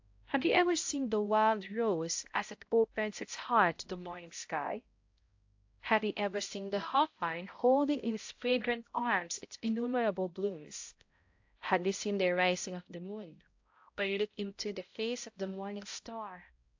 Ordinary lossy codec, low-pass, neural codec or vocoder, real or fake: AAC, 48 kbps; 7.2 kHz; codec, 16 kHz, 0.5 kbps, X-Codec, HuBERT features, trained on balanced general audio; fake